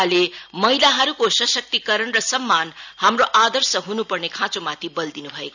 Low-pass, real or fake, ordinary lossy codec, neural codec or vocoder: 7.2 kHz; real; none; none